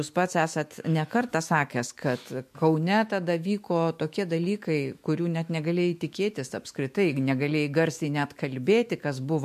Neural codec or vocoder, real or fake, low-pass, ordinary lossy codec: autoencoder, 48 kHz, 128 numbers a frame, DAC-VAE, trained on Japanese speech; fake; 14.4 kHz; MP3, 64 kbps